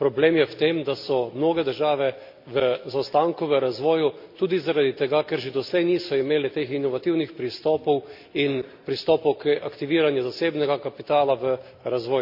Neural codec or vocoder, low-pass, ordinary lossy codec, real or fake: none; 5.4 kHz; MP3, 48 kbps; real